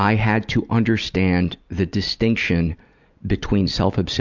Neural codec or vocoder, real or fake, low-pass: none; real; 7.2 kHz